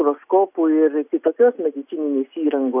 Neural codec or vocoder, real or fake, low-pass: none; real; 3.6 kHz